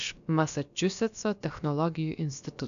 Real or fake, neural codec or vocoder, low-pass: fake; codec, 16 kHz, about 1 kbps, DyCAST, with the encoder's durations; 7.2 kHz